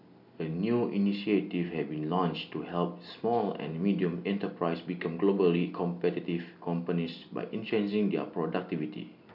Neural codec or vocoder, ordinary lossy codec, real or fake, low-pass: none; none; real; 5.4 kHz